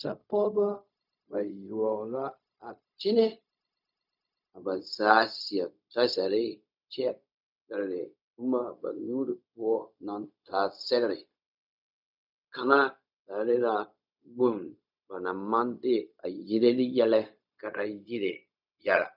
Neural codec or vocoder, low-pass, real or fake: codec, 16 kHz, 0.4 kbps, LongCat-Audio-Codec; 5.4 kHz; fake